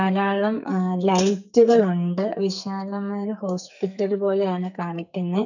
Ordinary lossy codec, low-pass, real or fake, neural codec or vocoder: none; 7.2 kHz; fake; codec, 44.1 kHz, 2.6 kbps, SNAC